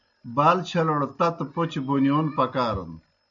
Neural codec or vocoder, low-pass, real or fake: none; 7.2 kHz; real